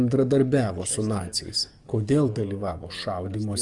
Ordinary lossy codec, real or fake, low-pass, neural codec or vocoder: Opus, 32 kbps; fake; 10.8 kHz; codec, 44.1 kHz, 7.8 kbps, DAC